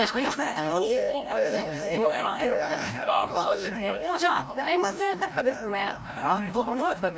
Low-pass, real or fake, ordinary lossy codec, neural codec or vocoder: none; fake; none; codec, 16 kHz, 0.5 kbps, FreqCodec, larger model